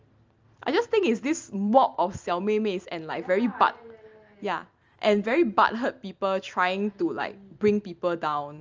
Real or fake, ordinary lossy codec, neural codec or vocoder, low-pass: real; Opus, 24 kbps; none; 7.2 kHz